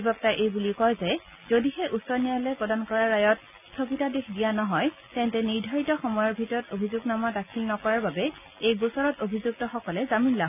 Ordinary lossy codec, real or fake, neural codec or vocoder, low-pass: none; real; none; 3.6 kHz